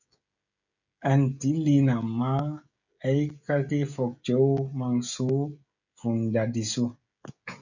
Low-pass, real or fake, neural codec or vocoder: 7.2 kHz; fake; codec, 16 kHz, 16 kbps, FreqCodec, smaller model